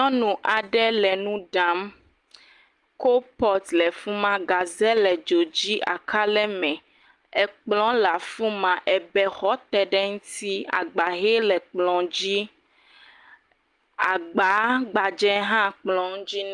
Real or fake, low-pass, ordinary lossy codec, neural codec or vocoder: real; 10.8 kHz; Opus, 32 kbps; none